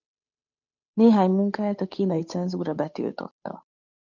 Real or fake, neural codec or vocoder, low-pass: fake; codec, 16 kHz, 8 kbps, FunCodec, trained on Chinese and English, 25 frames a second; 7.2 kHz